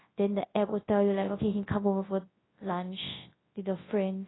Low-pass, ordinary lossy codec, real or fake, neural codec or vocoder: 7.2 kHz; AAC, 16 kbps; fake; codec, 24 kHz, 0.9 kbps, WavTokenizer, large speech release